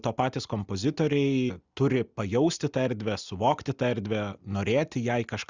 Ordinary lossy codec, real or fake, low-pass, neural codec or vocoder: Opus, 64 kbps; real; 7.2 kHz; none